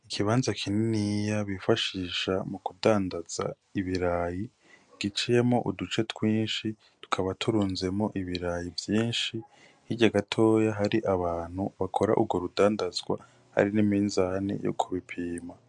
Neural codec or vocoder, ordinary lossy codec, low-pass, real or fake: none; MP3, 64 kbps; 9.9 kHz; real